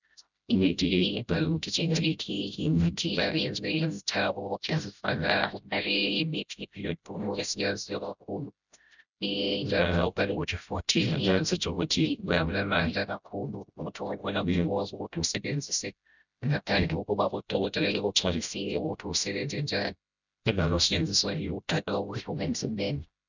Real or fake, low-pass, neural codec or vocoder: fake; 7.2 kHz; codec, 16 kHz, 0.5 kbps, FreqCodec, smaller model